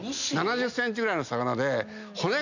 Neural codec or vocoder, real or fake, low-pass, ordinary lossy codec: none; real; 7.2 kHz; none